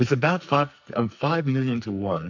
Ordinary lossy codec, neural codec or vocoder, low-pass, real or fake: MP3, 48 kbps; codec, 44.1 kHz, 2.6 kbps, SNAC; 7.2 kHz; fake